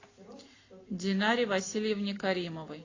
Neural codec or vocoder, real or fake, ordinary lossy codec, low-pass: none; real; AAC, 32 kbps; 7.2 kHz